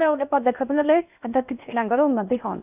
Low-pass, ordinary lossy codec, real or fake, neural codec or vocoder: 3.6 kHz; none; fake; codec, 16 kHz in and 24 kHz out, 0.6 kbps, FocalCodec, streaming, 4096 codes